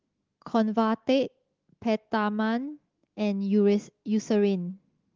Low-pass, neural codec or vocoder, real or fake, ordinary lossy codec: 7.2 kHz; autoencoder, 48 kHz, 128 numbers a frame, DAC-VAE, trained on Japanese speech; fake; Opus, 16 kbps